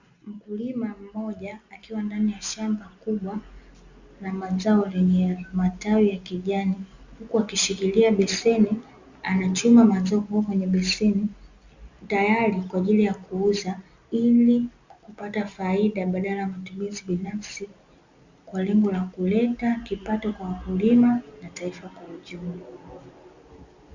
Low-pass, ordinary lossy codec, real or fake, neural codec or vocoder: 7.2 kHz; Opus, 64 kbps; real; none